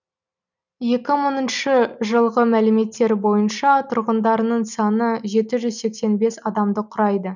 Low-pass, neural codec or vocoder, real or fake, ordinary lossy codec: 7.2 kHz; none; real; none